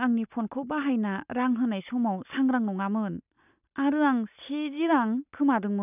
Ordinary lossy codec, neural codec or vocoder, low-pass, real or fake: none; none; 3.6 kHz; real